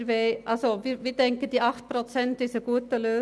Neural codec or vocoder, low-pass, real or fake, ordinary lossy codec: none; none; real; none